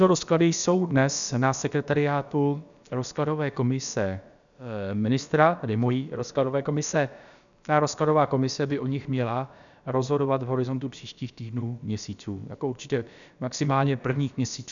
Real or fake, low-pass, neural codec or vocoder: fake; 7.2 kHz; codec, 16 kHz, about 1 kbps, DyCAST, with the encoder's durations